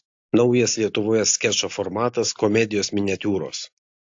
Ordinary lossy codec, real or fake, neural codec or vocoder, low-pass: AAC, 48 kbps; real; none; 7.2 kHz